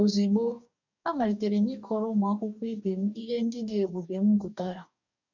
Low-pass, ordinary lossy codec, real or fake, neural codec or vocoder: 7.2 kHz; none; fake; codec, 44.1 kHz, 2.6 kbps, DAC